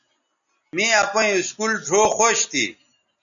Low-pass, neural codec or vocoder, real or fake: 7.2 kHz; none; real